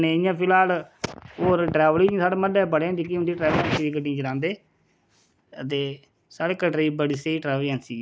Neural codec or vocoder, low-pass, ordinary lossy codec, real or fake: none; none; none; real